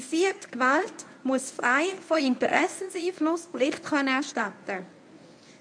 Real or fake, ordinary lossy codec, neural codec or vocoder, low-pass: fake; MP3, 64 kbps; codec, 24 kHz, 0.9 kbps, WavTokenizer, medium speech release version 1; 9.9 kHz